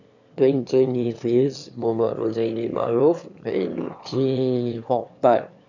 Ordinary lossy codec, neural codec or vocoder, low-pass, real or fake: none; autoencoder, 22.05 kHz, a latent of 192 numbers a frame, VITS, trained on one speaker; 7.2 kHz; fake